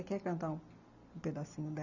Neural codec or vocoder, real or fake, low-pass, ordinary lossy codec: none; real; 7.2 kHz; none